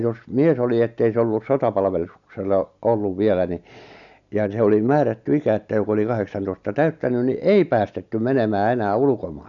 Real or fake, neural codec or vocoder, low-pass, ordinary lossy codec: real; none; 7.2 kHz; none